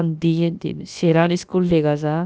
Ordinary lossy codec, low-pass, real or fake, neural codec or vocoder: none; none; fake; codec, 16 kHz, about 1 kbps, DyCAST, with the encoder's durations